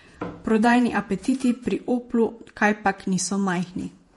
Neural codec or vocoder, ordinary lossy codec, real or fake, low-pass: vocoder, 48 kHz, 128 mel bands, Vocos; MP3, 48 kbps; fake; 19.8 kHz